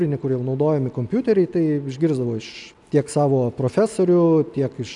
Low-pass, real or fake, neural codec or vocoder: 10.8 kHz; real; none